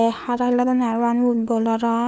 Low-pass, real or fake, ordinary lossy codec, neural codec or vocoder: none; fake; none; codec, 16 kHz, 4 kbps, FunCodec, trained on LibriTTS, 50 frames a second